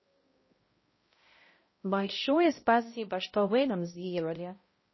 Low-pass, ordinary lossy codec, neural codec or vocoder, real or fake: 7.2 kHz; MP3, 24 kbps; codec, 16 kHz, 0.5 kbps, X-Codec, HuBERT features, trained on balanced general audio; fake